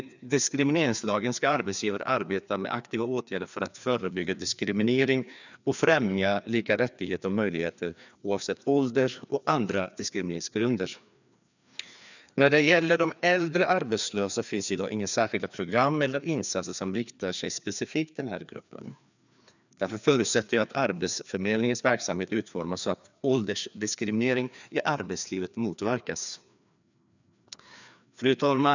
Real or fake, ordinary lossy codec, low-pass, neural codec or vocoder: fake; none; 7.2 kHz; codec, 16 kHz, 2 kbps, FreqCodec, larger model